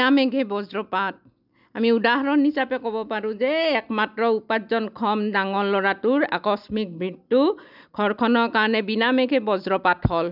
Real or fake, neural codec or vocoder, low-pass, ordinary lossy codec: real; none; 5.4 kHz; none